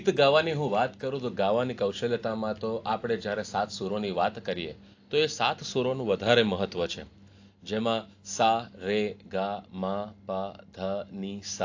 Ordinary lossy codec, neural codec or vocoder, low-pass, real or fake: AAC, 48 kbps; none; 7.2 kHz; real